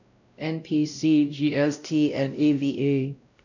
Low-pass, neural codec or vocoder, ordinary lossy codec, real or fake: 7.2 kHz; codec, 16 kHz, 0.5 kbps, X-Codec, WavLM features, trained on Multilingual LibriSpeech; none; fake